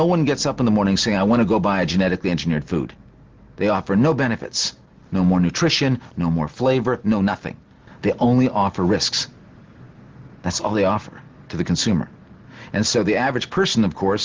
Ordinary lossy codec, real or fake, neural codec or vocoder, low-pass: Opus, 16 kbps; real; none; 7.2 kHz